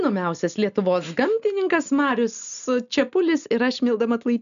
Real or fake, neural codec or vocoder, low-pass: real; none; 7.2 kHz